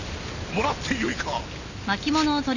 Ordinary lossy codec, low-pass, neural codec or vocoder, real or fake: none; 7.2 kHz; none; real